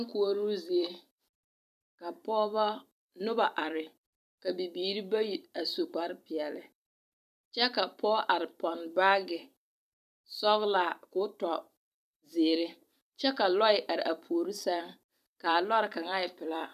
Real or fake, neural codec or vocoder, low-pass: fake; vocoder, 44.1 kHz, 128 mel bands every 256 samples, BigVGAN v2; 14.4 kHz